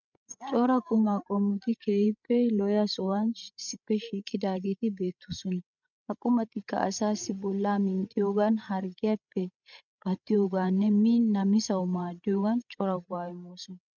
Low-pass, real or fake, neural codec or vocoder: 7.2 kHz; fake; codec, 16 kHz, 16 kbps, FreqCodec, larger model